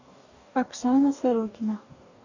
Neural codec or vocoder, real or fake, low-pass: codec, 44.1 kHz, 2.6 kbps, DAC; fake; 7.2 kHz